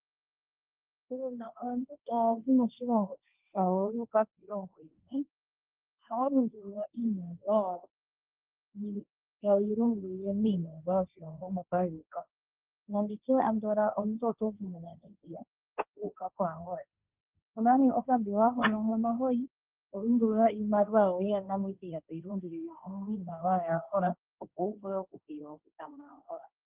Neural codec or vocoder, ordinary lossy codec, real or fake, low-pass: codec, 16 kHz, 1.1 kbps, Voila-Tokenizer; Opus, 32 kbps; fake; 3.6 kHz